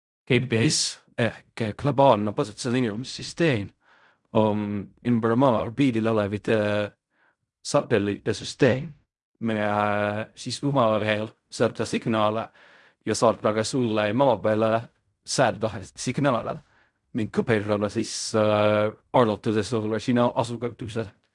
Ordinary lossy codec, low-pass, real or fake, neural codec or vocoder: none; 10.8 kHz; fake; codec, 16 kHz in and 24 kHz out, 0.4 kbps, LongCat-Audio-Codec, fine tuned four codebook decoder